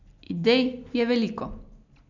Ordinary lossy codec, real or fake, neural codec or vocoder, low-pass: none; real; none; 7.2 kHz